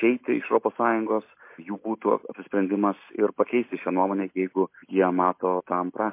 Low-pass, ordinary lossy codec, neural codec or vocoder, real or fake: 3.6 kHz; MP3, 24 kbps; none; real